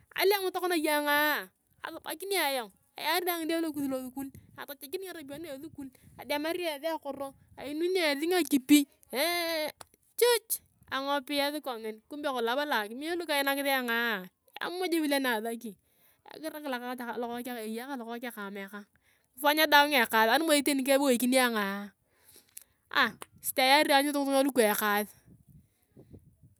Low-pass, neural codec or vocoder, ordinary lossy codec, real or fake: none; none; none; real